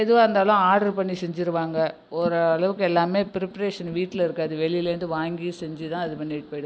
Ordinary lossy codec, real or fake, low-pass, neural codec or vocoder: none; real; none; none